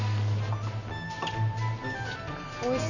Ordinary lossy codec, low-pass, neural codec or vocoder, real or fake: none; 7.2 kHz; none; real